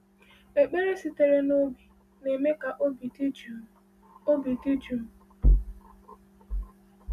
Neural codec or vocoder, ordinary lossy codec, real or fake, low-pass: none; none; real; 14.4 kHz